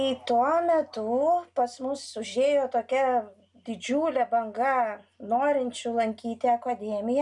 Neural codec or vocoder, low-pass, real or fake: none; 10.8 kHz; real